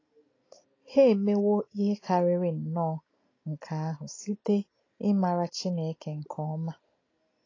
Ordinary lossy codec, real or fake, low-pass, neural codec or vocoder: AAC, 32 kbps; real; 7.2 kHz; none